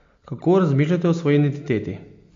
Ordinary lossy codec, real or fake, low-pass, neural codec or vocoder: MP3, 48 kbps; real; 7.2 kHz; none